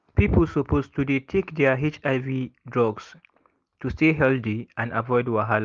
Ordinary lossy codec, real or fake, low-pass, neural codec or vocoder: Opus, 32 kbps; real; 7.2 kHz; none